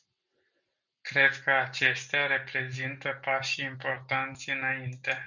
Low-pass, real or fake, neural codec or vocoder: 7.2 kHz; real; none